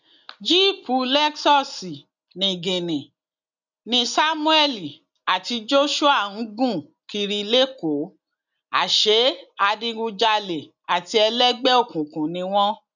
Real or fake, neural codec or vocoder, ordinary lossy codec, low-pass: real; none; none; 7.2 kHz